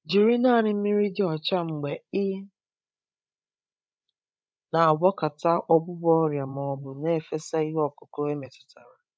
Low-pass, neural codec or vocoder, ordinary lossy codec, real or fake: none; codec, 16 kHz, 16 kbps, FreqCodec, larger model; none; fake